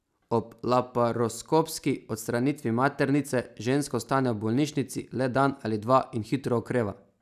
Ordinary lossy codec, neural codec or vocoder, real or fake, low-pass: none; none; real; 14.4 kHz